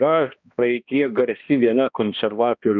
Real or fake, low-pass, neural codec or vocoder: fake; 7.2 kHz; codec, 16 kHz, 1 kbps, X-Codec, HuBERT features, trained on balanced general audio